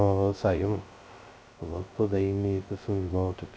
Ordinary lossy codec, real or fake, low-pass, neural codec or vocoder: none; fake; none; codec, 16 kHz, 0.2 kbps, FocalCodec